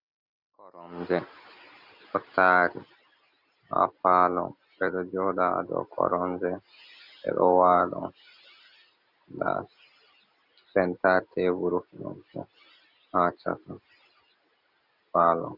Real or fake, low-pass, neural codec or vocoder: real; 5.4 kHz; none